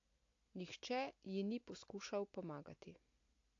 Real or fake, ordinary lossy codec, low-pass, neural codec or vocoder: real; AAC, 48 kbps; 7.2 kHz; none